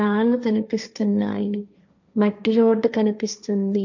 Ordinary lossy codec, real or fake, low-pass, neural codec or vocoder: none; fake; 7.2 kHz; codec, 16 kHz, 1.1 kbps, Voila-Tokenizer